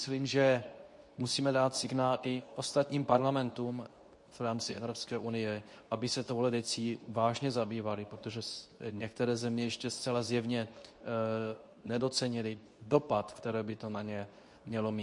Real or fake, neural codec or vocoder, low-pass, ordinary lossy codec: fake; codec, 24 kHz, 0.9 kbps, WavTokenizer, medium speech release version 2; 10.8 kHz; MP3, 48 kbps